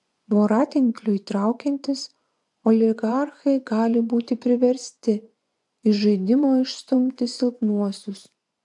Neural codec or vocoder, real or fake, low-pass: vocoder, 24 kHz, 100 mel bands, Vocos; fake; 10.8 kHz